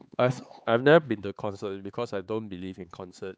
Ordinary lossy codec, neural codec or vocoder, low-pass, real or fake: none; codec, 16 kHz, 4 kbps, X-Codec, HuBERT features, trained on LibriSpeech; none; fake